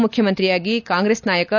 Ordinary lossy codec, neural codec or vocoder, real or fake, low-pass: none; none; real; 7.2 kHz